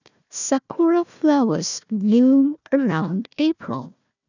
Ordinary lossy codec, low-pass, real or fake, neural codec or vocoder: none; 7.2 kHz; fake; codec, 16 kHz, 1 kbps, FunCodec, trained on Chinese and English, 50 frames a second